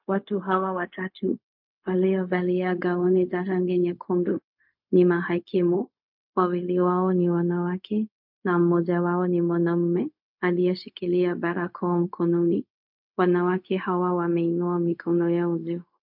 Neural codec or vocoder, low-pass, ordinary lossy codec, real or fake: codec, 16 kHz, 0.4 kbps, LongCat-Audio-Codec; 5.4 kHz; MP3, 48 kbps; fake